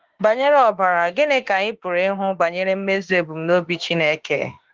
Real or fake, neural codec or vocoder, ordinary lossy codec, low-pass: fake; autoencoder, 48 kHz, 32 numbers a frame, DAC-VAE, trained on Japanese speech; Opus, 16 kbps; 7.2 kHz